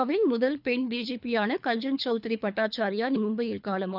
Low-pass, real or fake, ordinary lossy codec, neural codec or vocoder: 5.4 kHz; fake; none; codec, 24 kHz, 3 kbps, HILCodec